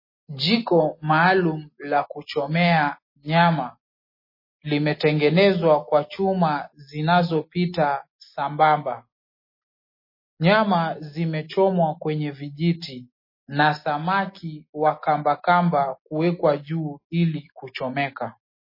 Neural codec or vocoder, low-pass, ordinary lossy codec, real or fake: none; 5.4 kHz; MP3, 24 kbps; real